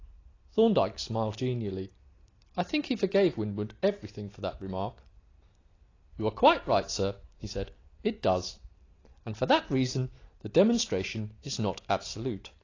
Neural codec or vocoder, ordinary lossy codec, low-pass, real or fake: none; AAC, 32 kbps; 7.2 kHz; real